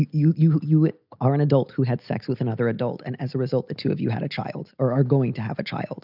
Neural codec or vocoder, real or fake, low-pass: none; real; 5.4 kHz